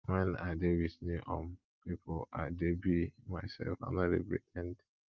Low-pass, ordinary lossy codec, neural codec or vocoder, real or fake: 7.2 kHz; Opus, 32 kbps; none; real